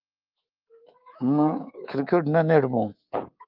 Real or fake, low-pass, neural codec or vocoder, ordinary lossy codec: fake; 5.4 kHz; codec, 24 kHz, 3.1 kbps, DualCodec; Opus, 24 kbps